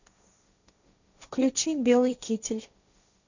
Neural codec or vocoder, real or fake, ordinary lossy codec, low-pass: codec, 16 kHz, 1.1 kbps, Voila-Tokenizer; fake; none; 7.2 kHz